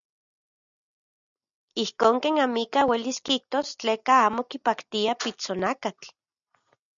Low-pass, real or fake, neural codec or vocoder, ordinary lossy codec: 7.2 kHz; real; none; MP3, 96 kbps